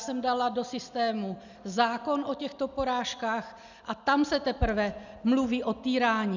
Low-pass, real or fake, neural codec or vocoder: 7.2 kHz; real; none